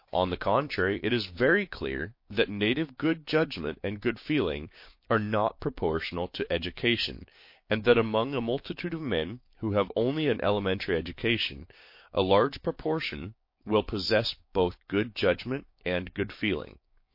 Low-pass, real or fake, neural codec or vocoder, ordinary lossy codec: 5.4 kHz; fake; codec, 16 kHz, 6 kbps, DAC; MP3, 32 kbps